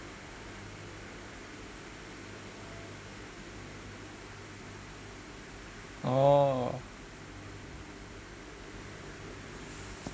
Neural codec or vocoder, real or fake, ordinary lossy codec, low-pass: none; real; none; none